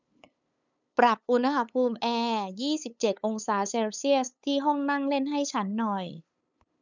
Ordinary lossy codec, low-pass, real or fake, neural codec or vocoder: none; 7.2 kHz; fake; codec, 16 kHz, 8 kbps, FunCodec, trained on LibriTTS, 25 frames a second